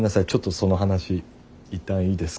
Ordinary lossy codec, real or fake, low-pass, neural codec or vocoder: none; real; none; none